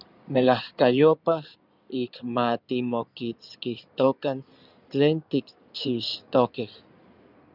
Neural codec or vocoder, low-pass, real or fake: codec, 16 kHz in and 24 kHz out, 2.2 kbps, FireRedTTS-2 codec; 5.4 kHz; fake